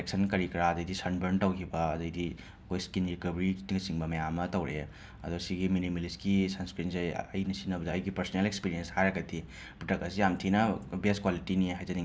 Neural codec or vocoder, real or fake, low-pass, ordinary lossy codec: none; real; none; none